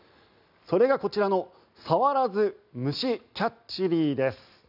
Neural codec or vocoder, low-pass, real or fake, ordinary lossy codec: none; 5.4 kHz; real; none